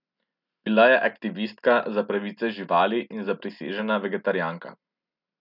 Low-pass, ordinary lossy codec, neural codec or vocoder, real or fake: 5.4 kHz; none; none; real